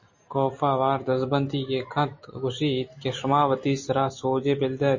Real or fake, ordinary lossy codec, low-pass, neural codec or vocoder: real; MP3, 32 kbps; 7.2 kHz; none